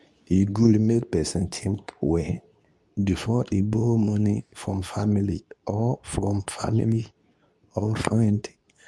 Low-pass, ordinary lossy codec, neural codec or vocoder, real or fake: none; none; codec, 24 kHz, 0.9 kbps, WavTokenizer, medium speech release version 2; fake